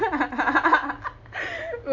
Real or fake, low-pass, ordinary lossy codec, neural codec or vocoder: real; 7.2 kHz; AAC, 48 kbps; none